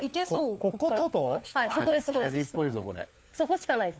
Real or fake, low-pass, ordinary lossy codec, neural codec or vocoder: fake; none; none; codec, 16 kHz, 4 kbps, FunCodec, trained on LibriTTS, 50 frames a second